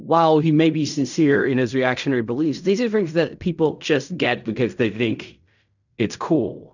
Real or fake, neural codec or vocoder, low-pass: fake; codec, 16 kHz in and 24 kHz out, 0.4 kbps, LongCat-Audio-Codec, fine tuned four codebook decoder; 7.2 kHz